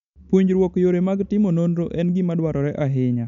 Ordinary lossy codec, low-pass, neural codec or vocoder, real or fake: none; 7.2 kHz; none; real